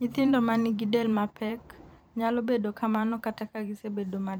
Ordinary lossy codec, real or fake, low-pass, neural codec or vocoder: none; fake; none; vocoder, 44.1 kHz, 128 mel bands every 512 samples, BigVGAN v2